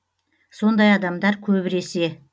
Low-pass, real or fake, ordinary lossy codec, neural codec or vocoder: none; real; none; none